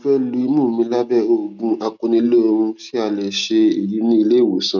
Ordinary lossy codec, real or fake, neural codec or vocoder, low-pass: none; real; none; 7.2 kHz